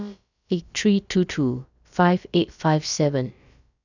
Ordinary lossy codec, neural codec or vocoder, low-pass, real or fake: none; codec, 16 kHz, about 1 kbps, DyCAST, with the encoder's durations; 7.2 kHz; fake